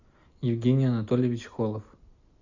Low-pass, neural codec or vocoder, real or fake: 7.2 kHz; none; real